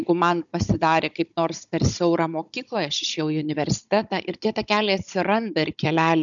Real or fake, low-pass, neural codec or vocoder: fake; 7.2 kHz; codec, 16 kHz, 16 kbps, FunCodec, trained on Chinese and English, 50 frames a second